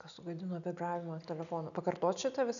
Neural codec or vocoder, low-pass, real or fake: none; 7.2 kHz; real